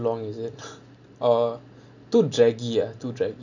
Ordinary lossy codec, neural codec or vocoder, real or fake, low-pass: none; none; real; 7.2 kHz